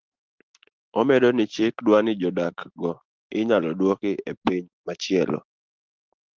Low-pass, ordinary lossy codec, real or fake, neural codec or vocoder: 7.2 kHz; Opus, 16 kbps; real; none